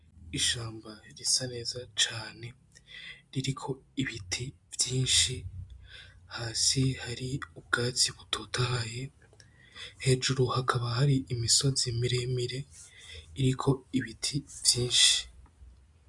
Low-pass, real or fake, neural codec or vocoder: 10.8 kHz; real; none